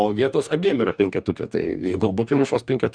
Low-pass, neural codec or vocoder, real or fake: 9.9 kHz; codec, 44.1 kHz, 2.6 kbps, DAC; fake